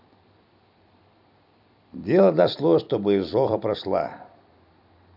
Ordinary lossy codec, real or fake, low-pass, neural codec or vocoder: none; fake; 5.4 kHz; vocoder, 44.1 kHz, 128 mel bands every 256 samples, BigVGAN v2